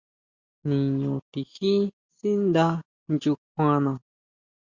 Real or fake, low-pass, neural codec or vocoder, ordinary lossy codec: real; 7.2 kHz; none; Opus, 64 kbps